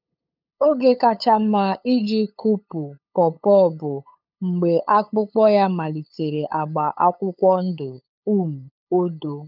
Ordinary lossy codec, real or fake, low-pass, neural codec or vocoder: none; fake; 5.4 kHz; codec, 16 kHz, 8 kbps, FunCodec, trained on LibriTTS, 25 frames a second